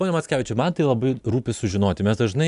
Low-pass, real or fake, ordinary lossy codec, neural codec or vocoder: 10.8 kHz; real; MP3, 96 kbps; none